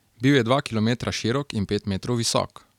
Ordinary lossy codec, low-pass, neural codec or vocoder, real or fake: none; 19.8 kHz; none; real